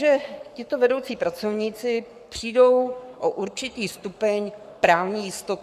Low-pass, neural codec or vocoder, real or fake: 14.4 kHz; codec, 44.1 kHz, 7.8 kbps, Pupu-Codec; fake